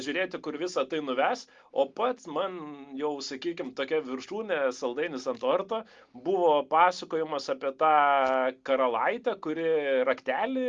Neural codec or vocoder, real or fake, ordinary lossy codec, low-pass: none; real; Opus, 32 kbps; 7.2 kHz